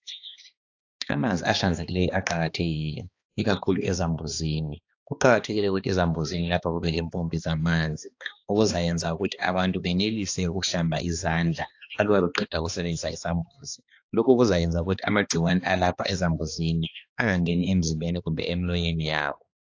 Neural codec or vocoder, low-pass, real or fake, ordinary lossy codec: codec, 16 kHz, 2 kbps, X-Codec, HuBERT features, trained on balanced general audio; 7.2 kHz; fake; AAC, 48 kbps